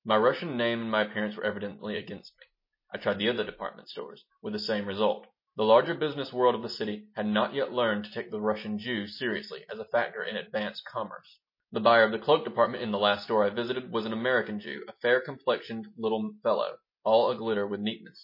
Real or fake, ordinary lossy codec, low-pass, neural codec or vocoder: real; MP3, 24 kbps; 5.4 kHz; none